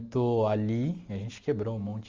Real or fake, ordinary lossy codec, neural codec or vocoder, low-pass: real; Opus, 32 kbps; none; 7.2 kHz